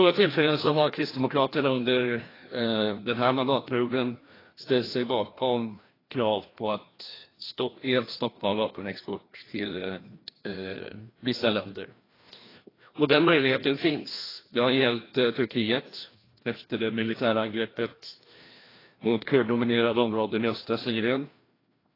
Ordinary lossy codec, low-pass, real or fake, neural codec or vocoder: AAC, 24 kbps; 5.4 kHz; fake; codec, 16 kHz, 1 kbps, FreqCodec, larger model